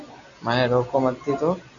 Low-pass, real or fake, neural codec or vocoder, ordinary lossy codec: 7.2 kHz; real; none; Opus, 64 kbps